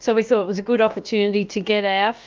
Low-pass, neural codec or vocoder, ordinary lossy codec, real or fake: 7.2 kHz; codec, 16 kHz, about 1 kbps, DyCAST, with the encoder's durations; Opus, 24 kbps; fake